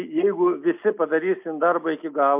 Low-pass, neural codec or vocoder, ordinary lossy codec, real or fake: 3.6 kHz; none; MP3, 32 kbps; real